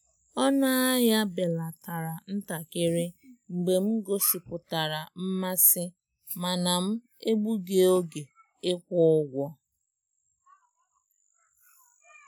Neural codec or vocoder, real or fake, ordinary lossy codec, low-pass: none; real; none; none